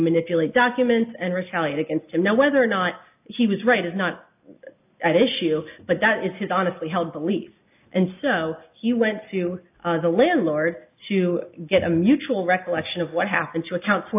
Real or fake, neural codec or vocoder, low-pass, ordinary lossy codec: real; none; 3.6 kHz; AAC, 32 kbps